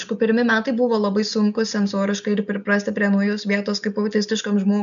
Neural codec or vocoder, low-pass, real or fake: none; 7.2 kHz; real